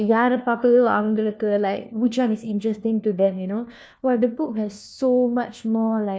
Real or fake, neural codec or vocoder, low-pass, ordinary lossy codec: fake; codec, 16 kHz, 1 kbps, FunCodec, trained on LibriTTS, 50 frames a second; none; none